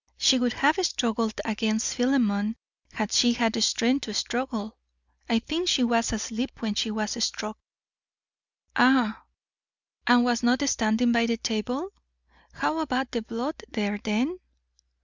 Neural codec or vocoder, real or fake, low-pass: none; real; 7.2 kHz